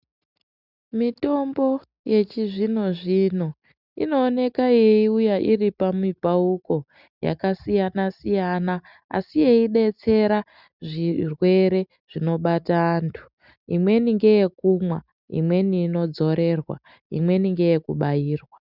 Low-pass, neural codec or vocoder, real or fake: 5.4 kHz; none; real